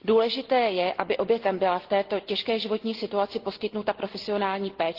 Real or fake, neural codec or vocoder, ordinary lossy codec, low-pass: real; none; Opus, 16 kbps; 5.4 kHz